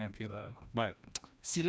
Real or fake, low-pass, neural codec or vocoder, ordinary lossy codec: fake; none; codec, 16 kHz, 1 kbps, FreqCodec, larger model; none